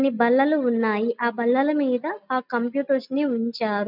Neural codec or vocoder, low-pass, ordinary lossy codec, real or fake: none; 5.4 kHz; none; real